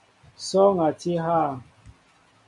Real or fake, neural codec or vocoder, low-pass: real; none; 10.8 kHz